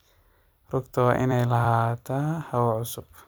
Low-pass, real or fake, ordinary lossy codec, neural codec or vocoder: none; fake; none; vocoder, 44.1 kHz, 128 mel bands every 256 samples, BigVGAN v2